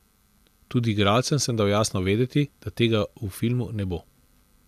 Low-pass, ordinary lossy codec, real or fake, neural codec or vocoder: 14.4 kHz; none; real; none